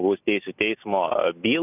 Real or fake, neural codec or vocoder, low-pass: real; none; 3.6 kHz